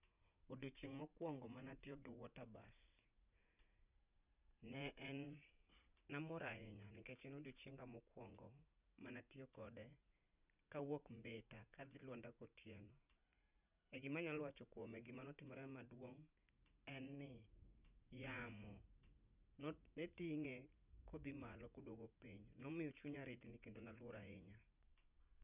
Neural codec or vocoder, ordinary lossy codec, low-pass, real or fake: vocoder, 44.1 kHz, 80 mel bands, Vocos; none; 3.6 kHz; fake